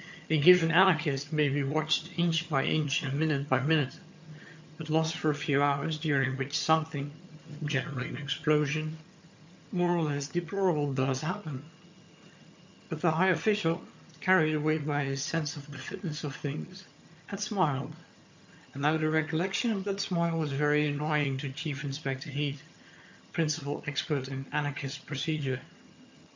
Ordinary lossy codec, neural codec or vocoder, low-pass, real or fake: MP3, 64 kbps; vocoder, 22.05 kHz, 80 mel bands, HiFi-GAN; 7.2 kHz; fake